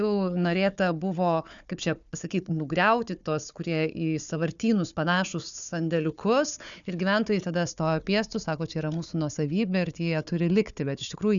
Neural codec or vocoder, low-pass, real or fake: codec, 16 kHz, 4 kbps, FunCodec, trained on Chinese and English, 50 frames a second; 7.2 kHz; fake